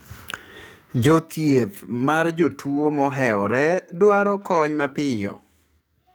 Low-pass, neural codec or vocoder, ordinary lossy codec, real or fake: none; codec, 44.1 kHz, 2.6 kbps, SNAC; none; fake